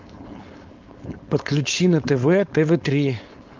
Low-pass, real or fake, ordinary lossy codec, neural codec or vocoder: 7.2 kHz; fake; Opus, 24 kbps; codec, 16 kHz, 4.8 kbps, FACodec